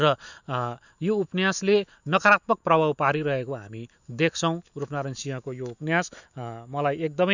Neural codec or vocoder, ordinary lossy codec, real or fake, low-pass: autoencoder, 48 kHz, 128 numbers a frame, DAC-VAE, trained on Japanese speech; none; fake; 7.2 kHz